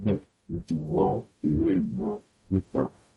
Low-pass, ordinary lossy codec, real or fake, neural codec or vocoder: 19.8 kHz; MP3, 48 kbps; fake; codec, 44.1 kHz, 0.9 kbps, DAC